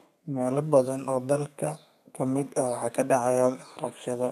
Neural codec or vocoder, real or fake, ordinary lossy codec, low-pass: codec, 32 kHz, 1.9 kbps, SNAC; fake; none; 14.4 kHz